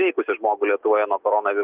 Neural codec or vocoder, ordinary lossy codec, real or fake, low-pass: none; Opus, 32 kbps; real; 3.6 kHz